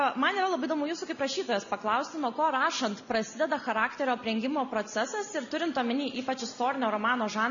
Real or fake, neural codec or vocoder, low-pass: real; none; 7.2 kHz